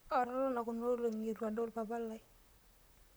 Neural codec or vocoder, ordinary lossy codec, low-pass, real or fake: vocoder, 44.1 kHz, 128 mel bands, Pupu-Vocoder; none; none; fake